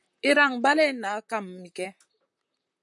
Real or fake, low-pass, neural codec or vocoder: fake; 10.8 kHz; vocoder, 44.1 kHz, 128 mel bands, Pupu-Vocoder